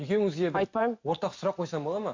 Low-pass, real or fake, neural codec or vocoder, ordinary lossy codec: 7.2 kHz; real; none; MP3, 48 kbps